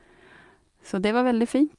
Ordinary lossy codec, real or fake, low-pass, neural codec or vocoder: Opus, 32 kbps; real; 10.8 kHz; none